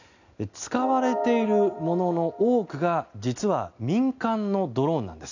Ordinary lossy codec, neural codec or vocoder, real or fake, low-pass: none; none; real; 7.2 kHz